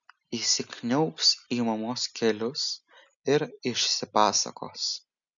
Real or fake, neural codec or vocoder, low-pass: real; none; 7.2 kHz